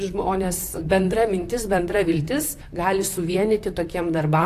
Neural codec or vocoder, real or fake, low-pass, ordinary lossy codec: vocoder, 44.1 kHz, 128 mel bands, Pupu-Vocoder; fake; 14.4 kHz; AAC, 64 kbps